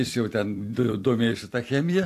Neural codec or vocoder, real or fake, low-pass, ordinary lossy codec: vocoder, 44.1 kHz, 128 mel bands every 256 samples, BigVGAN v2; fake; 14.4 kHz; AAC, 64 kbps